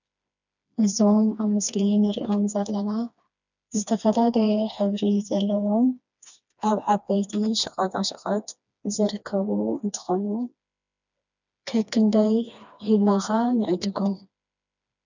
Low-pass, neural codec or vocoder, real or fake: 7.2 kHz; codec, 16 kHz, 2 kbps, FreqCodec, smaller model; fake